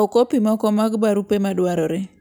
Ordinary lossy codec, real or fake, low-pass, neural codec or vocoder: none; real; none; none